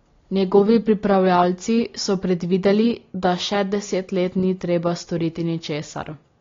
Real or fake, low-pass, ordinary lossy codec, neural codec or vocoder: real; 7.2 kHz; AAC, 32 kbps; none